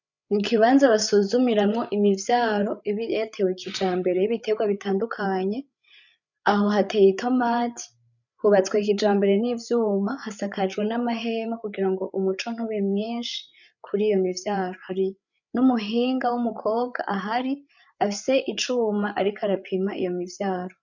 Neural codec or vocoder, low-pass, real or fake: codec, 16 kHz, 8 kbps, FreqCodec, larger model; 7.2 kHz; fake